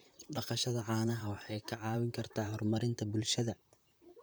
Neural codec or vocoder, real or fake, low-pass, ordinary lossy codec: vocoder, 44.1 kHz, 128 mel bands, Pupu-Vocoder; fake; none; none